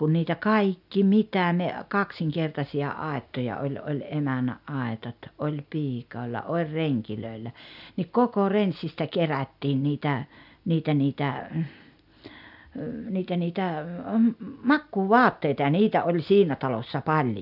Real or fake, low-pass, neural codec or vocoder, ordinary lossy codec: real; 5.4 kHz; none; none